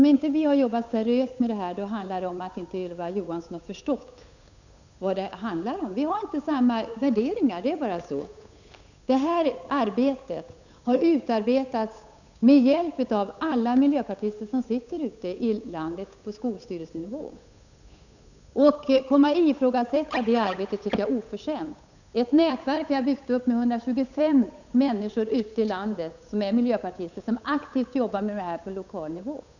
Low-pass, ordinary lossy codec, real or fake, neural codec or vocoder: 7.2 kHz; none; fake; codec, 16 kHz, 8 kbps, FunCodec, trained on Chinese and English, 25 frames a second